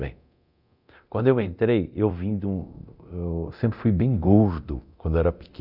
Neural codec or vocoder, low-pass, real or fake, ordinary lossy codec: codec, 24 kHz, 0.9 kbps, DualCodec; 5.4 kHz; fake; none